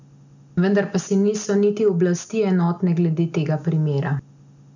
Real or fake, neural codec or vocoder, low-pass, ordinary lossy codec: real; none; 7.2 kHz; none